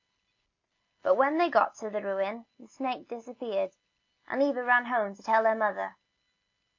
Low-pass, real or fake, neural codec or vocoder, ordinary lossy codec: 7.2 kHz; real; none; MP3, 48 kbps